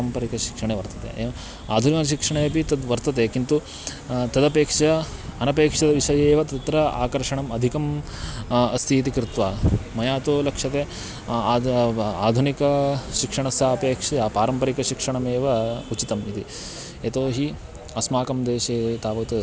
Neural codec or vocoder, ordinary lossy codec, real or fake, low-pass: none; none; real; none